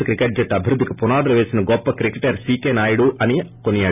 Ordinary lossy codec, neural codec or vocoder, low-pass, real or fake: none; none; 3.6 kHz; real